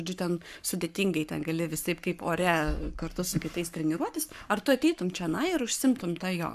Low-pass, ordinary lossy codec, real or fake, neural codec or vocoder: 14.4 kHz; AAC, 96 kbps; fake; codec, 44.1 kHz, 7.8 kbps, Pupu-Codec